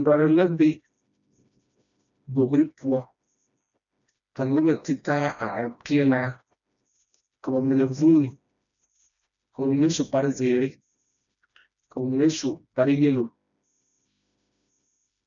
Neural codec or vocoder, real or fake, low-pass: codec, 16 kHz, 1 kbps, FreqCodec, smaller model; fake; 7.2 kHz